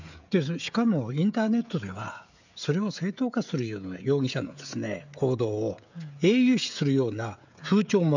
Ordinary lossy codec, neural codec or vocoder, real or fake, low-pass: none; codec, 16 kHz, 16 kbps, FreqCodec, smaller model; fake; 7.2 kHz